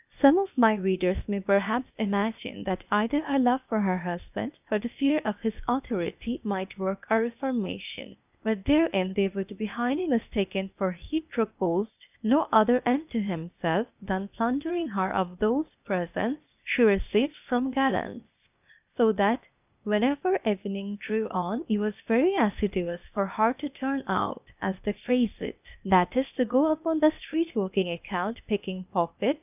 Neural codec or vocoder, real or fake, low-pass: codec, 16 kHz, 0.8 kbps, ZipCodec; fake; 3.6 kHz